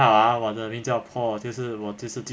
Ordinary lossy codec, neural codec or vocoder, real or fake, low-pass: none; none; real; none